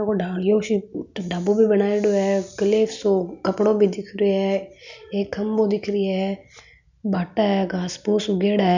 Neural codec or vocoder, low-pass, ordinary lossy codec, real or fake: none; 7.2 kHz; none; real